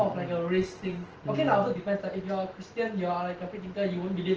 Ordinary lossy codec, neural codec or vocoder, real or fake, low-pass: Opus, 16 kbps; none; real; 7.2 kHz